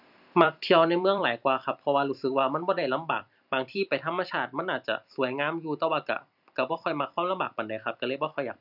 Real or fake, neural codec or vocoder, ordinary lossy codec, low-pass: fake; vocoder, 44.1 kHz, 128 mel bands every 256 samples, BigVGAN v2; none; 5.4 kHz